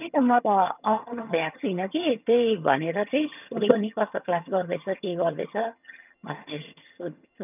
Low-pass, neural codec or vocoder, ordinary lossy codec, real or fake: 3.6 kHz; vocoder, 22.05 kHz, 80 mel bands, HiFi-GAN; none; fake